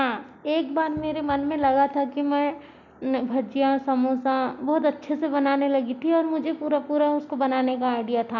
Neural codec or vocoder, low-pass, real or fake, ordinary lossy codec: none; 7.2 kHz; real; none